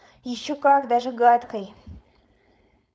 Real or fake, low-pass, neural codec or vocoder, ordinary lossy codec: fake; none; codec, 16 kHz, 4.8 kbps, FACodec; none